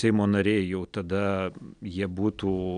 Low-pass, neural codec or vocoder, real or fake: 9.9 kHz; none; real